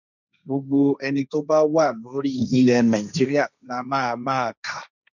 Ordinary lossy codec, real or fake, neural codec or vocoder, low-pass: none; fake; codec, 16 kHz, 1.1 kbps, Voila-Tokenizer; 7.2 kHz